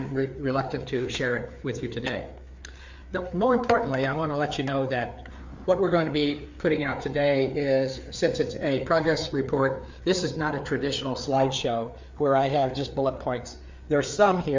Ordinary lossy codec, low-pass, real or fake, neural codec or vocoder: MP3, 64 kbps; 7.2 kHz; fake; codec, 16 kHz, 4 kbps, FreqCodec, larger model